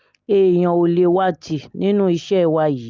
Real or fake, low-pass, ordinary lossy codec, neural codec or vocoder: real; 7.2 kHz; Opus, 24 kbps; none